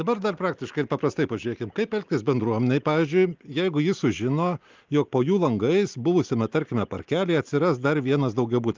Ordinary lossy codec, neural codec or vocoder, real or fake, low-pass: Opus, 32 kbps; none; real; 7.2 kHz